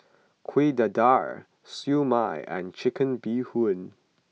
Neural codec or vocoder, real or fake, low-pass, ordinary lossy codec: none; real; none; none